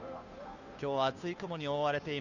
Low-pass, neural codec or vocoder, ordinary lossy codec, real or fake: 7.2 kHz; codec, 16 kHz, 2 kbps, FunCodec, trained on Chinese and English, 25 frames a second; none; fake